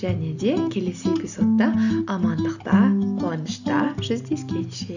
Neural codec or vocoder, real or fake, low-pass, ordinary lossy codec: none; real; 7.2 kHz; none